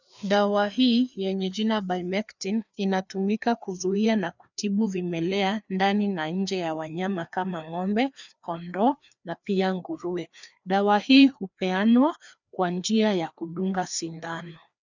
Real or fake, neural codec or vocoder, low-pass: fake; codec, 16 kHz, 2 kbps, FreqCodec, larger model; 7.2 kHz